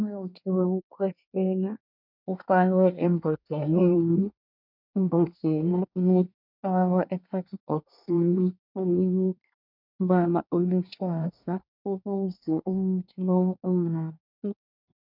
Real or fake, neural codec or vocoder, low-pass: fake; codec, 24 kHz, 1 kbps, SNAC; 5.4 kHz